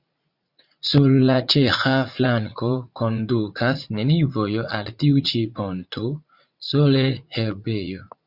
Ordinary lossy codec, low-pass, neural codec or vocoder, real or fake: Opus, 64 kbps; 5.4 kHz; vocoder, 44.1 kHz, 80 mel bands, Vocos; fake